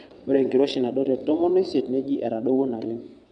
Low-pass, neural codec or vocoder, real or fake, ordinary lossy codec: 9.9 kHz; vocoder, 22.05 kHz, 80 mel bands, WaveNeXt; fake; none